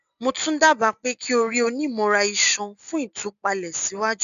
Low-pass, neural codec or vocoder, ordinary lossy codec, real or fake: 7.2 kHz; none; none; real